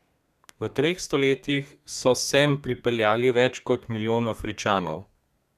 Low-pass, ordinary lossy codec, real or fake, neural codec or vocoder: 14.4 kHz; none; fake; codec, 32 kHz, 1.9 kbps, SNAC